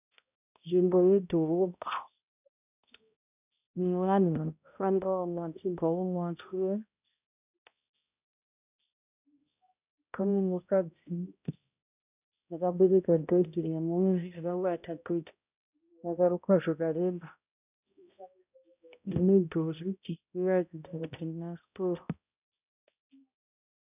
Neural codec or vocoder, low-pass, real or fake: codec, 16 kHz, 0.5 kbps, X-Codec, HuBERT features, trained on balanced general audio; 3.6 kHz; fake